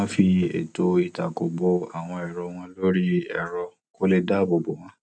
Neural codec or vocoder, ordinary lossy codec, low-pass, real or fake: none; none; 9.9 kHz; real